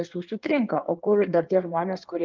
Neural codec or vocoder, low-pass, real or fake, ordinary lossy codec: codec, 16 kHz in and 24 kHz out, 1.1 kbps, FireRedTTS-2 codec; 7.2 kHz; fake; Opus, 32 kbps